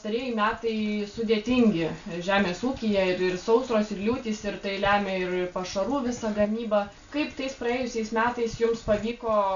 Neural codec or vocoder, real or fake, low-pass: none; real; 7.2 kHz